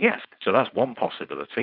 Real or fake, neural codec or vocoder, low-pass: fake; codec, 16 kHz, 4.8 kbps, FACodec; 5.4 kHz